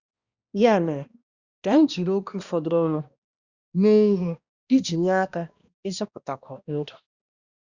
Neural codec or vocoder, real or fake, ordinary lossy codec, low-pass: codec, 16 kHz, 1 kbps, X-Codec, HuBERT features, trained on balanced general audio; fake; Opus, 64 kbps; 7.2 kHz